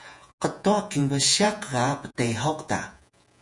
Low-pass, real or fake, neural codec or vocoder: 10.8 kHz; fake; vocoder, 48 kHz, 128 mel bands, Vocos